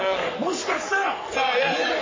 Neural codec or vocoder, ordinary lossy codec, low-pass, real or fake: codec, 44.1 kHz, 3.4 kbps, Pupu-Codec; MP3, 32 kbps; 7.2 kHz; fake